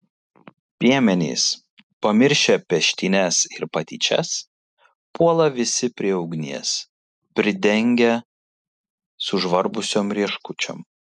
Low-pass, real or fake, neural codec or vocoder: 10.8 kHz; real; none